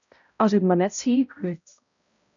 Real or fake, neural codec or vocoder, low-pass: fake; codec, 16 kHz, 0.5 kbps, X-Codec, HuBERT features, trained on balanced general audio; 7.2 kHz